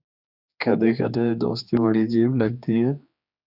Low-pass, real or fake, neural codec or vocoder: 5.4 kHz; fake; codec, 16 kHz, 2 kbps, X-Codec, HuBERT features, trained on general audio